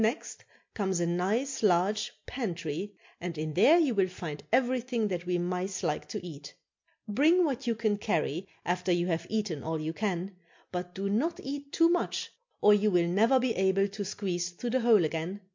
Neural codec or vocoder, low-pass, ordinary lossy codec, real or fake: none; 7.2 kHz; MP3, 48 kbps; real